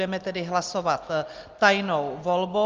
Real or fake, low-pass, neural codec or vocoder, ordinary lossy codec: real; 7.2 kHz; none; Opus, 32 kbps